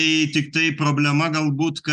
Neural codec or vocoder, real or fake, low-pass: none; real; 9.9 kHz